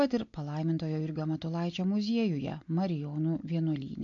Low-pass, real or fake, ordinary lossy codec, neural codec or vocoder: 7.2 kHz; real; AAC, 48 kbps; none